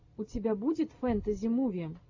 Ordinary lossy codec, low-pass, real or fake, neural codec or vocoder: AAC, 48 kbps; 7.2 kHz; real; none